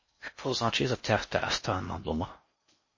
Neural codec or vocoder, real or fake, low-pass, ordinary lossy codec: codec, 16 kHz in and 24 kHz out, 0.6 kbps, FocalCodec, streaming, 4096 codes; fake; 7.2 kHz; MP3, 32 kbps